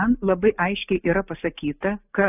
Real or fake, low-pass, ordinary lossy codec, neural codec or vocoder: real; 3.6 kHz; AAC, 24 kbps; none